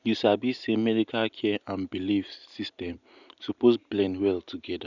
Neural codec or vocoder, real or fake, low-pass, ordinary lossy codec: none; real; 7.2 kHz; none